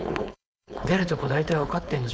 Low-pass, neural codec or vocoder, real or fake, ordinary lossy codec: none; codec, 16 kHz, 4.8 kbps, FACodec; fake; none